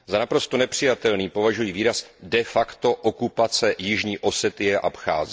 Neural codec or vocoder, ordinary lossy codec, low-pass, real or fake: none; none; none; real